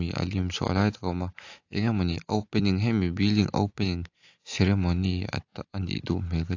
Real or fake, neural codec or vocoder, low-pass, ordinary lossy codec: real; none; 7.2 kHz; AAC, 48 kbps